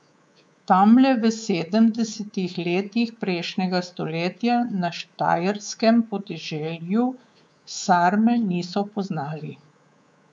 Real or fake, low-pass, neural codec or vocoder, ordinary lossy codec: fake; 9.9 kHz; codec, 24 kHz, 3.1 kbps, DualCodec; none